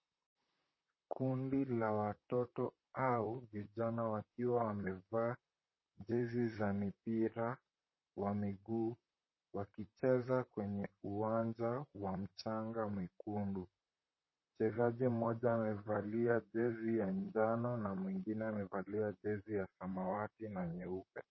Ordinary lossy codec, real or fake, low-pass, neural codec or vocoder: MP3, 24 kbps; fake; 7.2 kHz; vocoder, 44.1 kHz, 128 mel bands, Pupu-Vocoder